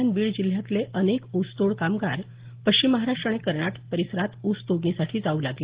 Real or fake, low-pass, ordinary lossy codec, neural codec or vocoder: real; 3.6 kHz; Opus, 16 kbps; none